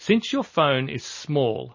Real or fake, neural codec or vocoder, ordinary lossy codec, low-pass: real; none; MP3, 32 kbps; 7.2 kHz